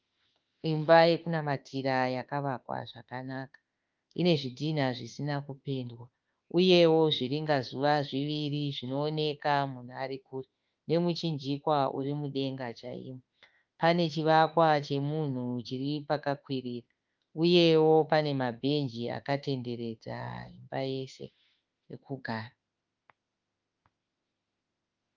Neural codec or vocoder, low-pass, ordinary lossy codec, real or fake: autoencoder, 48 kHz, 32 numbers a frame, DAC-VAE, trained on Japanese speech; 7.2 kHz; Opus, 32 kbps; fake